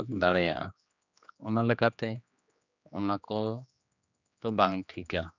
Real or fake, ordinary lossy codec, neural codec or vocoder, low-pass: fake; none; codec, 16 kHz, 2 kbps, X-Codec, HuBERT features, trained on general audio; 7.2 kHz